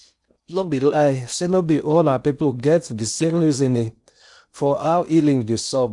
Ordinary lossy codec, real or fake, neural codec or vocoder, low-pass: none; fake; codec, 16 kHz in and 24 kHz out, 0.6 kbps, FocalCodec, streaming, 4096 codes; 10.8 kHz